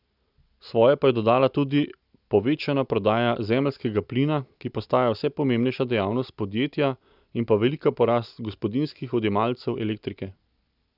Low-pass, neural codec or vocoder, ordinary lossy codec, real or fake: 5.4 kHz; none; none; real